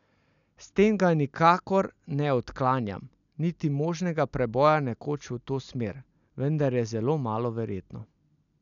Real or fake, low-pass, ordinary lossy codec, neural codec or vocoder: real; 7.2 kHz; none; none